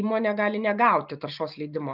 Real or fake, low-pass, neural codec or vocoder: real; 5.4 kHz; none